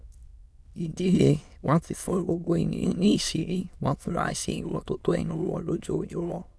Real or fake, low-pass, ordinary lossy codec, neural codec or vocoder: fake; none; none; autoencoder, 22.05 kHz, a latent of 192 numbers a frame, VITS, trained on many speakers